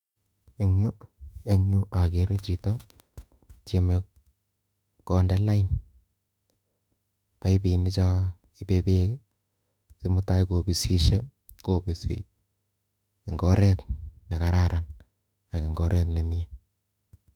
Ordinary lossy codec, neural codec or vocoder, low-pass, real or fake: none; autoencoder, 48 kHz, 32 numbers a frame, DAC-VAE, trained on Japanese speech; 19.8 kHz; fake